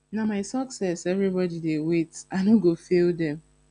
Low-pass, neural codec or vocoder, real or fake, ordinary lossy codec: 9.9 kHz; none; real; AAC, 96 kbps